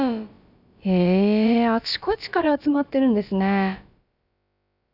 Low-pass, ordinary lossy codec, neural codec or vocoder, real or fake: 5.4 kHz; none; codec, 16 kHz, about 1 kbps, DyCAST, with the encoder's durations; fake